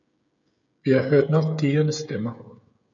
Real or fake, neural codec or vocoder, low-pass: fake; codec, 16 kHz, 16 kbps, FreqCodec, smaller model; 7.2 kHz